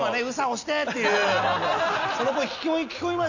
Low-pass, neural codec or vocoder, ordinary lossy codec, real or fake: 7.2 kHz; none; none; real